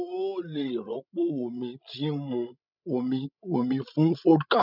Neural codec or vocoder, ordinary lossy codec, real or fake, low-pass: codec, 16 kHz, 16 kbps, FreqCodec, larger model; none; fake; 5.4 kHz